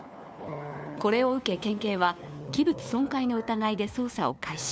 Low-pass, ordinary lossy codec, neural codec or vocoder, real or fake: none; none; codec, 16 kHz, 4 kbps, FunCodec, trained on LibriTTS, 50 frames a second; fake